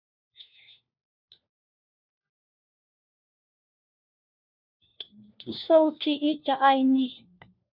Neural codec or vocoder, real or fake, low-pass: codec, 16 kHz, 1 kbps, FunCodec, trained on LibriTTS, 50 frames a second; fake; 5.4 kHz